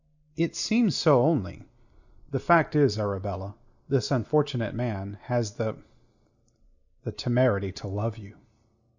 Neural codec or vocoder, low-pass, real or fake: none; 7.2 kHz; real